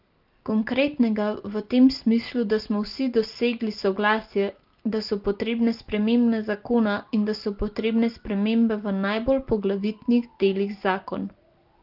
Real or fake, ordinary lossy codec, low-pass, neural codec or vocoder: real; Opus, 32 kbps; 5.4 kHz; none